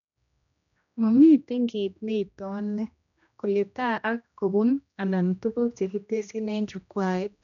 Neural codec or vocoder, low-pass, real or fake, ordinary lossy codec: codec, 16 kHz, 1 kbps, X-Codec, HuBERT features, trained on general audio; 7.2 kHz; fake; none